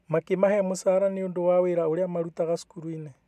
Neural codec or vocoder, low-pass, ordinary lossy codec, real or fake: none; 14.4 kHz; none; real